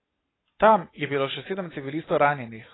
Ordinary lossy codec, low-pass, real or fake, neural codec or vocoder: AAC, 16 kbps; 7.2 kHz; real; none